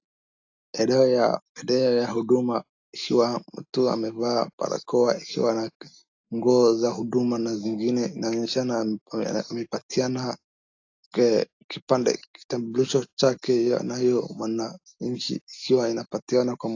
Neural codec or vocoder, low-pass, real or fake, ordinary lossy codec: none; 7.2 kHz; real; AAC, 48 kbps